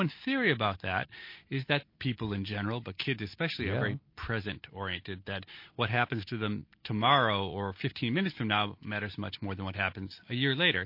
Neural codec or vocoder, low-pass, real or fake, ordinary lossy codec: none; 5.4 kHz; real; MP3, 32 kbps